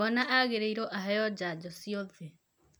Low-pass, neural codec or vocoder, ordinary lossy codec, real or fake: none; none; none; real